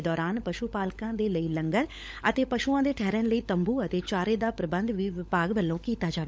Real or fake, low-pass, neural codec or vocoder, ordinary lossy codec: fake; none; codec, 16 kHz, 16 kbps, FunCodec, trained on LibriTTS, 50 frames a second; none